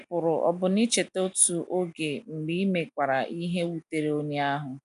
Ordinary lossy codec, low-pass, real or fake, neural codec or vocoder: none; 10.8 kHz; real; none